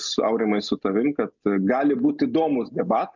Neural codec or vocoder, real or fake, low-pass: none; real; 7.2 kHz